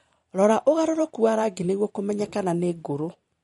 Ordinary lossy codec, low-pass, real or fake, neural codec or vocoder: MP3, 48 kbps; 19.8 kHz; fake; vocoder, 44.1 kHz, 128 mel bands every 512 samples, BigVGAN v2